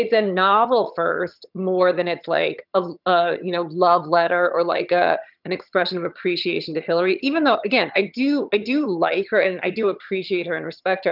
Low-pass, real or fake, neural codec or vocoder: 5.4 kHz; fake; vocoder, 22.05 kHz, 80 mel bands, HiFi-GAN